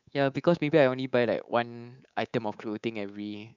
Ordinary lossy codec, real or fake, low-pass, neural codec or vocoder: none; fake; 7.2 kHz; codec, 24 kHz, 3.1 kbps, DualCodec